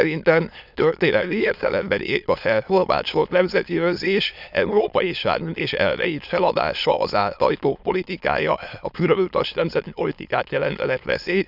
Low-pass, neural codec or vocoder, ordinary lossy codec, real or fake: 5.4 kHz; autoencoder, 22.05 kHz, a latent of 192 numbers a frame, VITS, trained on many speakers; none; fake